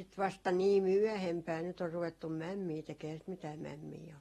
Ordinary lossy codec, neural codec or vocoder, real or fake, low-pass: AAC, 32 kbps; none; real; 19.8 kHz